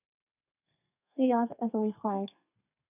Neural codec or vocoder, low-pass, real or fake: codec, 32 kHz, 1.9 kbps, SNAC; 3.6 kHz; fake